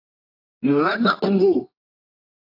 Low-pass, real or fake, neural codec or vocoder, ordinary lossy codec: 5.4 kHz; fake; codec, 24 kHz, 0.9 kbps, WavTokenizer, medium music audio release; AAC, 32 kbps